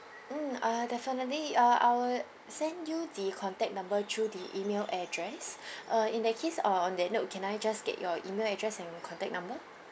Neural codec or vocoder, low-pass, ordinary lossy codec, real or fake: none; none; none; real